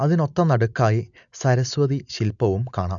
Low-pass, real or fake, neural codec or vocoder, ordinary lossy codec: 7.2 kHz; real; none; none